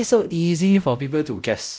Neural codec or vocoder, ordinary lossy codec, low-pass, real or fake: codec, 16 kHz, 0.5 kbps, X-Codec, WavLM features, trained on Multilingual LibriSpeech; none; none; fake